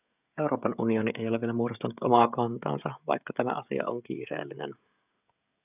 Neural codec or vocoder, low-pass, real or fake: codec, 16 kHz, 16 kbps, FreqCodec, smaller model; 3.6 kHz; fake